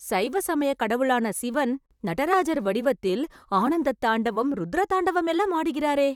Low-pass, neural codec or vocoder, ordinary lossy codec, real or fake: 19.8 kHz; vocoder, 44.1 kHz, 128 mel bands, Pupu-Vocoder; none; fake